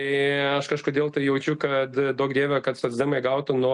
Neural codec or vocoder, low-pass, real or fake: none; 10.8 kHz; real